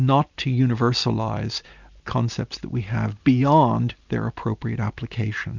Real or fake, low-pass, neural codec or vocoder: real; 7.2 kHz; none